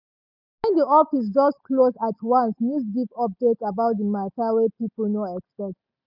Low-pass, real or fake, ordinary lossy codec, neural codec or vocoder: 5.4 kHz; real; none; none